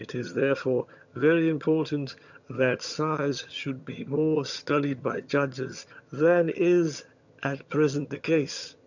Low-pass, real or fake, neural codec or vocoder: 7.2 kHz; fake; vocoder, 22.05 kHz, 80 mel bands, HiFi-GAN